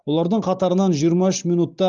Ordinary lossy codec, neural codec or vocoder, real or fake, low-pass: Opus, 32 kbps; none; real; 7.2 kHz